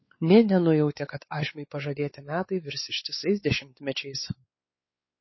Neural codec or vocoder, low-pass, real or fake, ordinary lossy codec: codec, 16 kHz, 4 kbps, X-Codec, WavLM features, trained on Multilingual LibriSpeech; 7.2 kHz; fake; MP3, 24 kbps